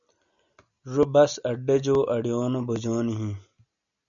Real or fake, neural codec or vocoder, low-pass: real; none; 7.2 kHz